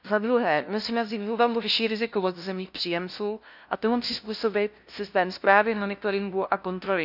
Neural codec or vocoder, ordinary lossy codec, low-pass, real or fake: codec, 16 kHz, 0.5 kbps, FunCodec, trained on LibriTTS, 25 frames a second; none; 5.4 kHz; fake